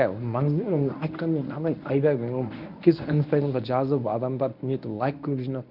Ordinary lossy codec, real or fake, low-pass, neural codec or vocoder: none; fake; 5.4 kHz; codec, 24 kHz, 0.9 kbps, WavTokenizer, medium speech release version 1